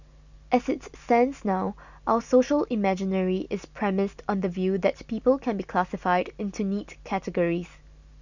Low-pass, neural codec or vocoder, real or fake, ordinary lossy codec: 7.2 kHz; none; real; none